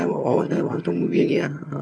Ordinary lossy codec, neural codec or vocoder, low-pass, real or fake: none; vocoder, 22.05 kHz, 80 mel bands, HiFi-GAN; none; fake